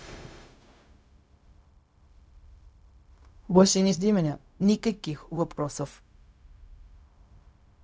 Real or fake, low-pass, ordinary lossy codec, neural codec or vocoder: fake; none; none; codec, 16 kHz, 0.4 kbps, LongCat-Audio-Codec